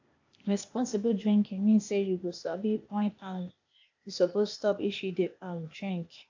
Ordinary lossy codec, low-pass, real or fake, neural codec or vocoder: AAC, 48 kbps; 7.2 kHz; fake; codec, 16 kHz, 0.8 kbps, ZipCodec